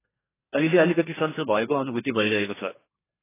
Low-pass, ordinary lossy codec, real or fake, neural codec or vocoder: 3.6 kHz; AAC, 16 kbps; fake; codec, 32 kHz, 1.9 kbps, SNAC